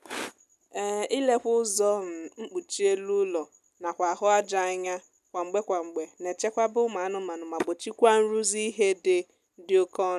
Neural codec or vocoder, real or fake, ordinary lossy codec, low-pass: none; real; none; 14.4 kHz